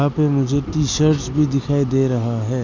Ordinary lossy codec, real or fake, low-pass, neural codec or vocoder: none; real; 7.2 kHz; none